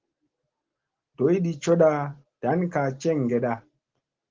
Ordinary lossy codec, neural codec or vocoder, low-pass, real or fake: Opus, 16 kbps; none; 7.2 kHz; real